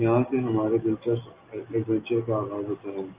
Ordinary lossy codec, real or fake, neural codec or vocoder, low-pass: Opus, 24 kbps; real; none; 3.6 kHz